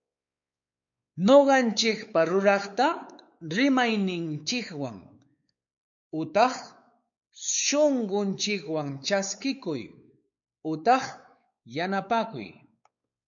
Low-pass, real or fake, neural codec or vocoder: 7.2 kHz; fake; codec, 16 kHz, 4 kbps, X-Codec, WavLM features, trained on Multilingual LibriSpeech